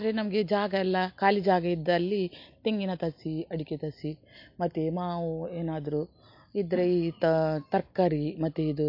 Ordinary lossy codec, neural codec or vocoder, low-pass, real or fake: MP3, 32 kbps; none; 5.4 kHz; real